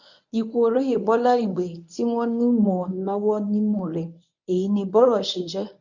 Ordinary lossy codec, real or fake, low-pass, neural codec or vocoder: none; fake; 7.2 kHz; codec, 24 kHz, 0.9 kbps, WavTokenizer, medium speech release version 1